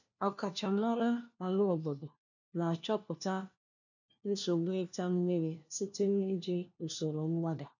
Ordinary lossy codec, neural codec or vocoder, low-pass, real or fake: none; codec, 16 kHz, 1 kbps, FunCodec, trained on LibriTTS, 50 frames a second; 7.2 kHz; fake